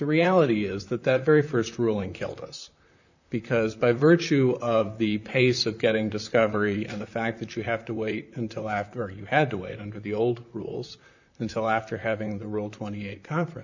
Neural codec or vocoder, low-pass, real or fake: vocoder, 44.1 kHz, 128 mel bands, Pupu-Vocoder; 7.2 kHz; fake